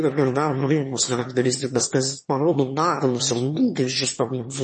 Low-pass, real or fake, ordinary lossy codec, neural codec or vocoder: 9.9 kHz; fake; MP3, 32 kbps; autoencoder, 22.05 kHz, a latent of 192 numbers a frame, VITS, trained on one speaker